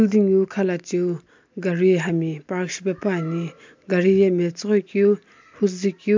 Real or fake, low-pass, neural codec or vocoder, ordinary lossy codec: real; 7.2 kHz; none; MP3, 64 kbps